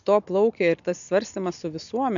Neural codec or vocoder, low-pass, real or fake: none; 7.2 kHz; real